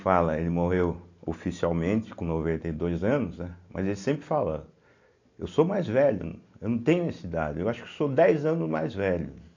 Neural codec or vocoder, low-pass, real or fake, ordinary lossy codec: none; 7.2 kHz; real; AAC, 48 kbps